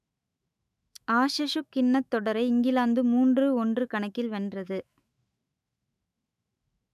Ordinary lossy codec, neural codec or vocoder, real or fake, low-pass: none; autoencoder, 48 kHz, 128 numbers a frame, DAC-VAE, trained on Japanese speech; fake; 14.4 kHz